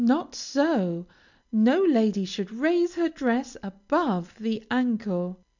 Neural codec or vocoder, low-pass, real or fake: none; 7.2 kHz; real